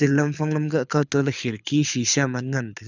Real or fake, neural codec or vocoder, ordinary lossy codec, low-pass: fake; codec, 24 kHz, 6 kbps, HILCodec; none; 7.2 kHz